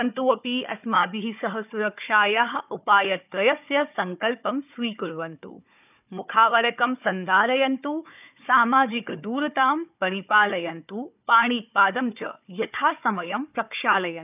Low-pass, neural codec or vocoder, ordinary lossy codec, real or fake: 3.6 kHz; codec, 16 kHz, 4 kbps, FunCodec, trained on Chinese and English, 50 frames a second; none; fake